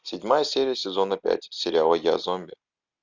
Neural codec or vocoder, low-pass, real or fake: none; 7.2 kHz; real